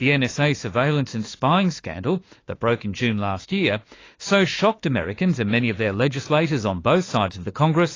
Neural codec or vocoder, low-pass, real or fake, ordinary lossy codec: autoencoder, 48 kHz, 32 numbers a frame, DAC-VAE, trained on Japanese speech; 7.2 kHz; fake; AAC, 32 kbps